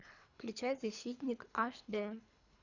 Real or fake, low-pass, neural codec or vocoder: fake; 7.2 kHz; codec, 24 kHz, 3 kbps, HILCodec